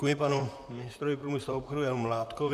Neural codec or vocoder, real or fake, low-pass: vocoder, 44.1 kHz, 128 mel bands, Pupu-Vocoder; fake; 14.4 kHz